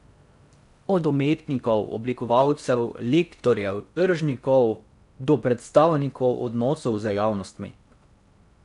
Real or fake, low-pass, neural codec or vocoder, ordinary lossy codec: fake; 10.8 kHz; codec, 16 kHz in and 24 kHz out, 0.6 kbps, FocalCodec, streaming, 4096 codes; none